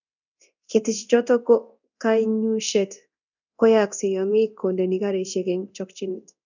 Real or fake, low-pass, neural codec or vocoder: fake; 7.2 kHz; codec, 24 kHz, 0.9 kbps, DualCodec